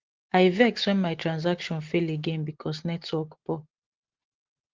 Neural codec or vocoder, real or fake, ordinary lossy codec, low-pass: none; real; Opus, 16 kbps; 7.2 kHz